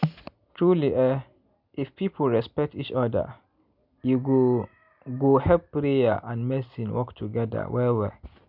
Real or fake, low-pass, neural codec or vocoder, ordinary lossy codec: real; 5.4 kHz; none; none